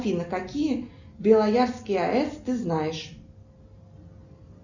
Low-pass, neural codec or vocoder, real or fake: 7.2 kHz; none; real